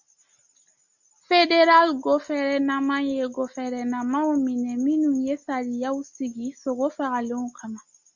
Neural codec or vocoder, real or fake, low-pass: none; real; 7.2 kHz